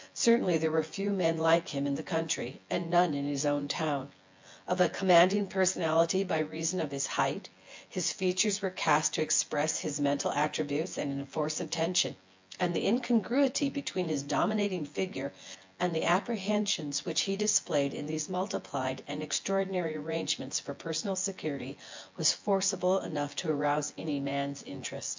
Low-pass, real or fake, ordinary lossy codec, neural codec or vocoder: 7.2 kHz; fake; MP3, 64 kbps; vocoder, 24 kHz, 100 mel bands, Vocos